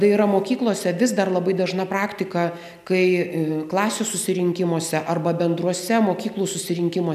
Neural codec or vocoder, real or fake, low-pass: none; real; 14.4 kHz